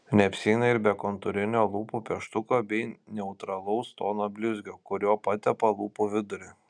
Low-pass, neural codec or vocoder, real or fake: 9.9 kHz; none; real